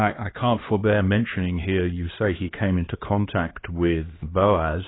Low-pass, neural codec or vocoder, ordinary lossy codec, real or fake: 7.2 kHz; codec, 16 kHz, 2 kbps, X-Codec, HuBERT features, trained on LibriSpeech; AAC, 16 kbps; fake